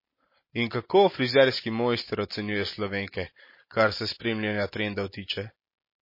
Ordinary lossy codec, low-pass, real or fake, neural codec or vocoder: MP3, 24 kbps; 5.4 kHz; real; none